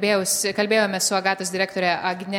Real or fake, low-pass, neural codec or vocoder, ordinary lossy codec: real; 19.8 kHz; none; MP3, 96 kbps